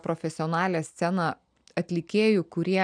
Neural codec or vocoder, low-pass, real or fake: none; 9.9 kHz; real